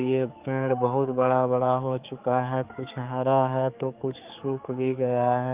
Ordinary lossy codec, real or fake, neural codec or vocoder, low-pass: Opus, 24 kbps; fake; codec, 16 kHz, 4 kbps, X-Codec, HuBERT features, trained on general audio; 3.6 kHz